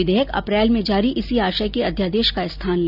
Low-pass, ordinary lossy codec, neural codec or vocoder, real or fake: 5.4 kHz; none; none; real